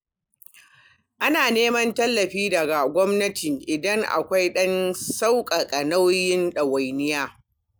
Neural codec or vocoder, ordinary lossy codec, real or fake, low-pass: none; none; real; none